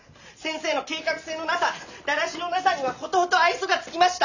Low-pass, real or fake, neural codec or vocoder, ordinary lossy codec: 7.2 kHz; real; none; none